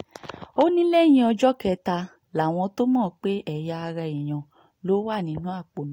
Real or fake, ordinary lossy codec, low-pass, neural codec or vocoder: real; AAC, 48 kbps; 19.8 kHz; none